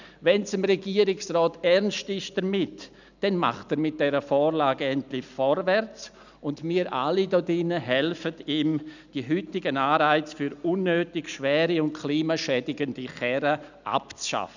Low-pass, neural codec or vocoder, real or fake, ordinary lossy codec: 7.2 kHz; none; real; none